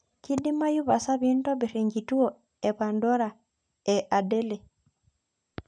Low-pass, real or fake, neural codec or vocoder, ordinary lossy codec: 9.9 kHz; real; none; none